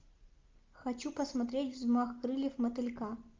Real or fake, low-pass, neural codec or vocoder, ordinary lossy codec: real; 7.2 kHz; none; Opus, 32 kbps